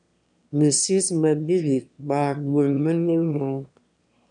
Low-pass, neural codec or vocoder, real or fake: 9.9 kHz; autoencoder, 22.05 kHz, a latent of 192 numbers a frame, VITS, trained on one speaker; fake